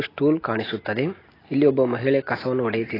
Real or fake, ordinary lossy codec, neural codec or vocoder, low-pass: real; AAC, 24 kbps; none; 5.4 kHz